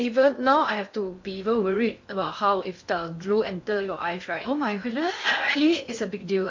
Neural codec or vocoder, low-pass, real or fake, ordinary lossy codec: codec, 16 kHz in and 24 kHz out, 0.6 kbps, FocalCodec, streaming, 2048 codes; 7.2 kHz; fake; MP3, 48 kbps